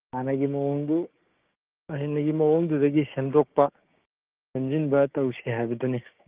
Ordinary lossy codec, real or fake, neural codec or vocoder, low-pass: Opus, 32 kbps; fake; codec, 16 kHz, 6 kbps, DAC; 3.6 kHz